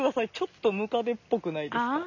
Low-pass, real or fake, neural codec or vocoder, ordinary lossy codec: 7.2 kHz; real; none; none